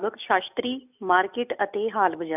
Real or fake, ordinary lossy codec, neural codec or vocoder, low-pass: real; none; none; 3.6 kHz